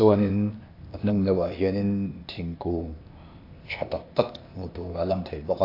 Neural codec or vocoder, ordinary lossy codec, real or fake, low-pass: codec, 16 kHz, 0.8 kbps, ZipCodec; AAC, 32 kbps; fake; 5.4 kHz